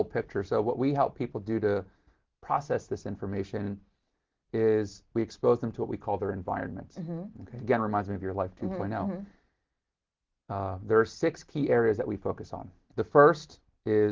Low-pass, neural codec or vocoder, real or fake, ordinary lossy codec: 7.2 kHz; none; real; Opus, 16 kbps